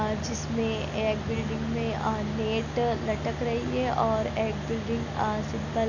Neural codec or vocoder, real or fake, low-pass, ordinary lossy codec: none; real; 7.2 kHz; none